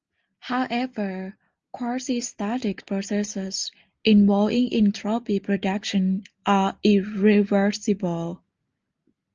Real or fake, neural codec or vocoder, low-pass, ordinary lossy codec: real; none; 7.2 kHz; Opus, 24 kbps